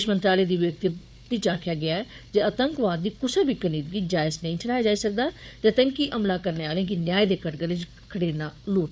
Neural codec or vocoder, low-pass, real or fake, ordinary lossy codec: codec, 16 kHz, 4 kbps, FunCodec, trained on Chinese and English, 50 frames a second; none; fake; none